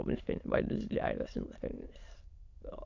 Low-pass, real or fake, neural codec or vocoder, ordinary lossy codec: 7.2 kHz; fake; autoencoder, 22.05 kHz, a latent of 192 numbers a frame, VITS, trained on many speakers; AAC, 48 kbps